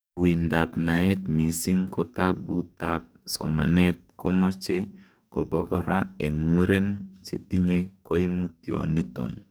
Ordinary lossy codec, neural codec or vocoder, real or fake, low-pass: none; codec, 44.1 kHz, 2.6 kbps, DAC; fake; none